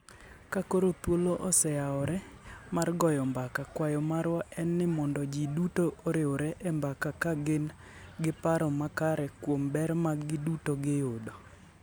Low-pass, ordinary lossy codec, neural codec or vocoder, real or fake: none; none; none; real